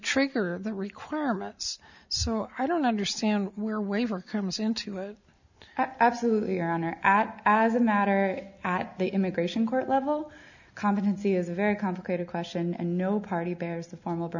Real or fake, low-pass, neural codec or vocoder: real; 7.2 kHz; none